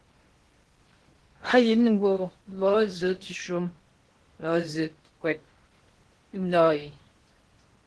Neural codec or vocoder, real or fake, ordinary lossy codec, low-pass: codec, 16 kHz in and 24 kHz out, 0.8 kbps, FocalCodec, streaming, 65536 codes; fake; Opus, 16 kbps; 10.8 kHz